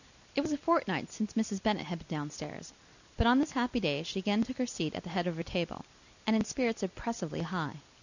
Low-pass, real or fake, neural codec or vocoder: 7.2 kHz; real; none